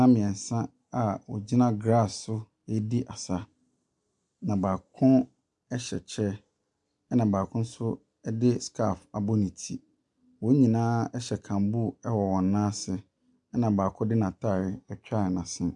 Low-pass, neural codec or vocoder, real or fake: 10.8 kHz; none; real